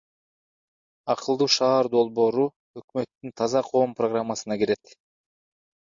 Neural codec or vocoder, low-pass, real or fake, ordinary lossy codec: none; 7.2 kHz; real; MP3, 48 kbps